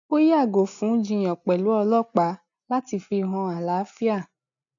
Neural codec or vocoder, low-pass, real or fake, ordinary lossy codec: none; 7.2 kHz; real; none